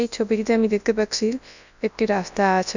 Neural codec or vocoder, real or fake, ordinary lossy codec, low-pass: codec, 24 kHz, 0.9 kbps, WavTokenizer, large speech release; fake; none; 7.2 kHz